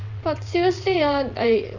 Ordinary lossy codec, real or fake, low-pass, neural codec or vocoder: none; fake; 7.2 kHz; vocoder, 22.05 kHz, 80 mel bands, WaveNeXt